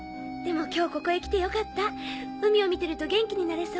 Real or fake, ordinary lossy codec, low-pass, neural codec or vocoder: real; none; none; none